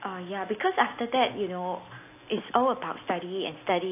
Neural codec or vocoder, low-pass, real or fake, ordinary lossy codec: none; 3.6 kHz; real; AAC, 24 kbps